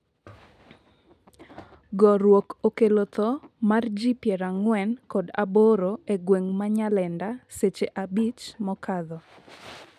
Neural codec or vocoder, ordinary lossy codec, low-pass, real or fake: none; none; 14.4 kHz; real